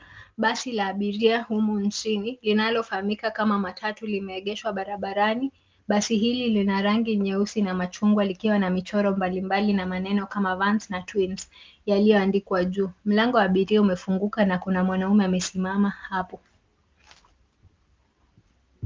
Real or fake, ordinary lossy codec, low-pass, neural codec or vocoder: real; Opus, 32 kbps; 7.2 kHz; none